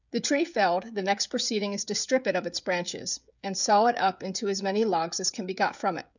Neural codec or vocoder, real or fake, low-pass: codec, 16 kHz, 16 kbps, FreqCodec, smaller model; fake; 7.2 kHz